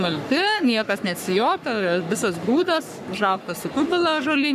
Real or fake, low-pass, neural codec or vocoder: fake; 14.4 kHz; codec, 44.1 kHz, 3.4 kbps, Pupu-Codec